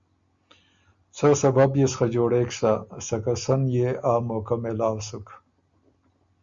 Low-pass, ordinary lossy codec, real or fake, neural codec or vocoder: 7.2 kHz; Opus, 64 kbps; real; none